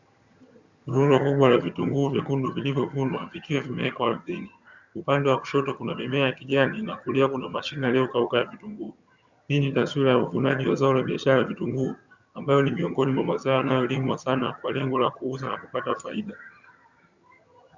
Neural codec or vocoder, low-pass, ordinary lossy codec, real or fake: vocoder, 22.05 kHz, 80 mel bands, HiFi-GAN; 7.2 kHz; Opus, 64 kbps; fake